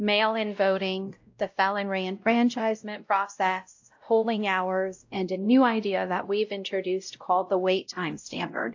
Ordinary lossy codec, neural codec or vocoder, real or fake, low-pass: AAC, 48 kbps; codec, 16 kHz, 0.5 kbps, X-Codec, WavLM features, trained on Multilingual LibriSpeech; fake; 7.2 kHz